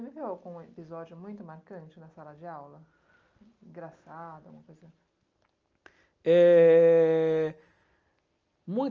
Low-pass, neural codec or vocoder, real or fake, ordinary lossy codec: 7.2 kHz; none; real; Opus, 24 kbps